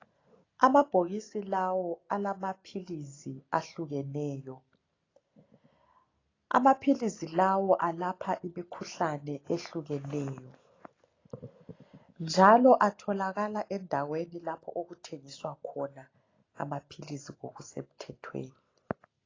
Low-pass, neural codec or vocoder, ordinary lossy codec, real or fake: 7.2 kHz; none; AAC, 32 kbps; real